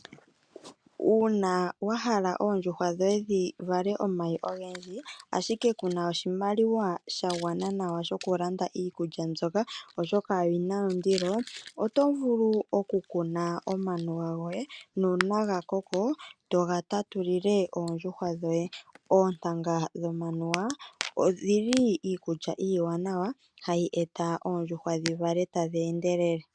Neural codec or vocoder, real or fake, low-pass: none; real; 9.9 kHz